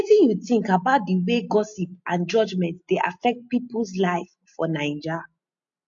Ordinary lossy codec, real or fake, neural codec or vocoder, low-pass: MP3, 48 kbps; real; none; 7.2 kHz